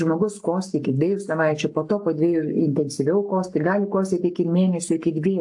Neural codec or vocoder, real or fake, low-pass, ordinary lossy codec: codec, 44.1 kHz, 7.8 kbps, Pupu-Codec; fake; 10.8 kHz; AAC, 64 kbps